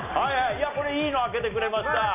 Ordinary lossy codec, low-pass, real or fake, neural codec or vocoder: none; 3.6 kHz; real; none